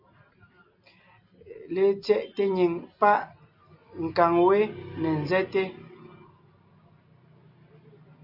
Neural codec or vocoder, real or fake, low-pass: none; real; 5.4 kHz